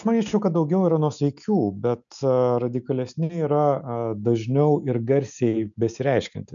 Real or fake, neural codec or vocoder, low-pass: real; none; 7.2 kHz